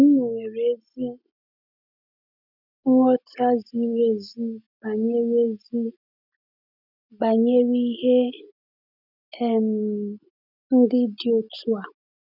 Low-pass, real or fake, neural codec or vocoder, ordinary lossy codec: 5.4 kHz; real; none; none